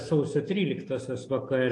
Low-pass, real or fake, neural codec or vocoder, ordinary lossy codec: 10.8 kHz; real; none; AAC, 48 kbps